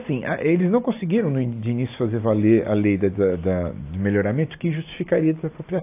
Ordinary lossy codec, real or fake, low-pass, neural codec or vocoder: AAC, 24 kbps; real; 3.6 kHz; none